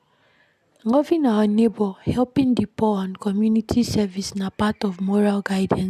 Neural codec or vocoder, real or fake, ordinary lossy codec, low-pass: none; real; none; 14.4 kHz